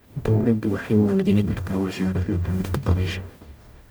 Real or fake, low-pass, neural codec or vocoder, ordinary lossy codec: fake; none; codec, 44.1 kHz, 0.9 kbps, DAC; none